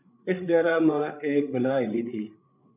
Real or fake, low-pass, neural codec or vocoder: fake; 3.6 kHz; codec, 16 kHz, 8 kbps, FreqCodec, larger model